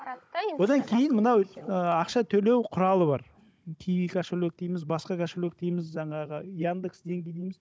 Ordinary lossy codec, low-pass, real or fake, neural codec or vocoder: none; none; fake; codec, 16 kHz, 16 kbps, FunCodec, trained on Chinese and English, 50 frames a second